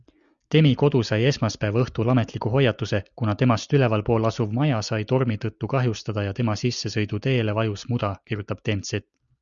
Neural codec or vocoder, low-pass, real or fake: none; 7.2 kHz; real